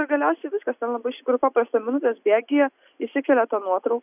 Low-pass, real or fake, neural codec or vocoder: 3.6 kHz; real; none